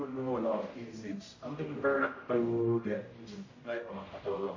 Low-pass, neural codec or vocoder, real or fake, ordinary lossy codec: 7.2 kHz; codec, 16 kHz, 0.5 kbps, X-Codec, HuBERT features, trained on general audio; fake; MP3, 32 kbps